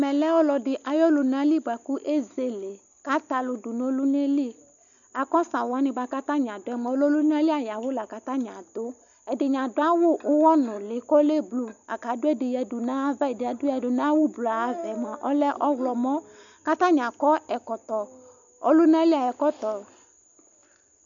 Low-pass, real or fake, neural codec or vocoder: 7.2 kHz; real; none